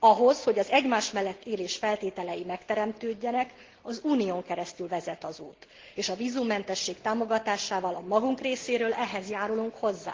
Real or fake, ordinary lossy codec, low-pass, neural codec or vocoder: real; Opus, 16 kbps; 7.2 kHz; none